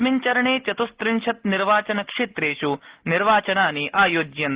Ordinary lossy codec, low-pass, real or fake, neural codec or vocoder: Opus, 16 kbps; 3.6 kHz; real; none